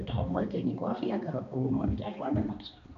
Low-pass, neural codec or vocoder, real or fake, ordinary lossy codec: 7.2 kHz; codec, 16 kHz, 2 kbps, X-Codec, HuBERT features, trained on balanced general audio; fake; none